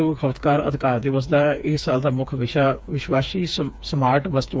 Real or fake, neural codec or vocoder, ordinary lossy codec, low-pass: fake; codec, 16 kHz, 4 kbps, FreqCodec, smaller model; none; none